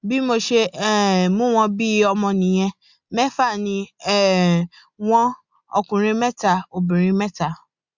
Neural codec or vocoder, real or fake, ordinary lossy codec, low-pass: none; real; Opus, 64 kbps; 7.2 kHz